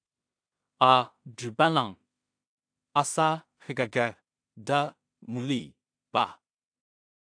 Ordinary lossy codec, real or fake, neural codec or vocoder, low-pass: AAC, 64 kbps; fake; codec, 16 kHz in and 24 kHz out, 0.4 kbps, LongCat-Audio-Codec, two codebook decoder; 9.9 kHz